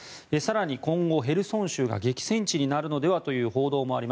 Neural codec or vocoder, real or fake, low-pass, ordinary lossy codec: none; real; none; none